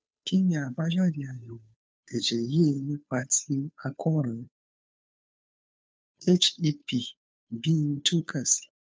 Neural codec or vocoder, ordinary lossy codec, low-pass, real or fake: codec, 16 kHz, 2 kbps, FunCodec, trained on Chinese and English, 25 frames a second; none; none; fake